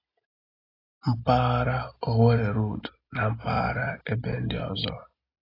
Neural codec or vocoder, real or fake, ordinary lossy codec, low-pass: none; real; AAC, 24 kbps; 5.4 kHz